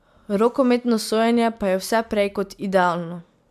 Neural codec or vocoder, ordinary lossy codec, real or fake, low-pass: none; AAC, 96 kbps; real; 14.4 kHz